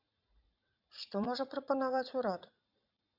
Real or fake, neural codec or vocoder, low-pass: fake; vocoder, 44.1 kHz, 80 mel bands, Vocos; 5.4 kHz